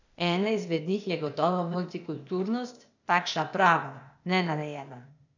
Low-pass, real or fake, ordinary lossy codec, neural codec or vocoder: 7.2 kHz; fake; none; codec, 16 kHz, 0.8 kbps, ZipCodec